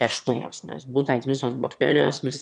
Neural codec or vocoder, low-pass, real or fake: autoencoder, 22.05 kHz, a latent of 192 numbers a frame, VITS, trained on one speaker; 9.9 kHz; fake